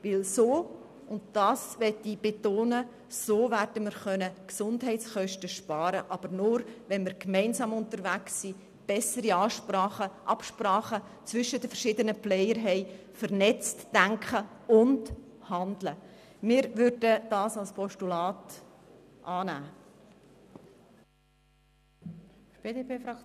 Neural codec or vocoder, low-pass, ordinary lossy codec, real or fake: none; 14.4 kHz; none; real